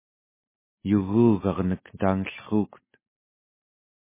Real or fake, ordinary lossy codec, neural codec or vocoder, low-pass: real; MP3, 16 kbps; none; 3.6 kHz